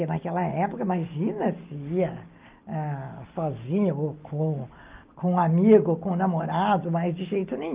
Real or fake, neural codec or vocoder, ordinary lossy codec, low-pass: real; none; Opus, 24 kbps; 3.6 kHz